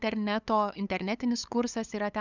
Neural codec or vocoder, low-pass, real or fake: codec, 16 kHz, 8 kbps, FunCodec, trained on LibriTTS, 25 frames a second; 7.2 kHz; fake